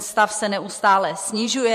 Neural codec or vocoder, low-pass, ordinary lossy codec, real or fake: vocoder, 44.1 kHz, 128 mel bands every 512 samples, BigVGAN v2; 14.4 kHz; MP3, 64 kbps; fake